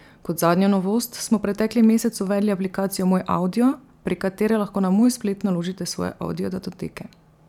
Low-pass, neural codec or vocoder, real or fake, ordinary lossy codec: 19.8 kHz; vocoder, 44.1 kHz, 128 mel bands every 512 samples, BigVGAN v2; fake; none